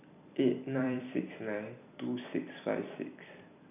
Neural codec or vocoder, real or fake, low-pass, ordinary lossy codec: vocoder, 44.1 kHz, 128 mel bands every 256 samples, BigVGAN v2; fake; 3.6 kHz; none